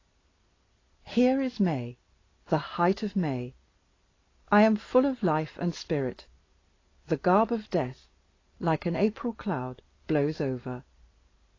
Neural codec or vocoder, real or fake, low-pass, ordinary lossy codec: none; real; 7.2 kHz; AAC, 32 kbps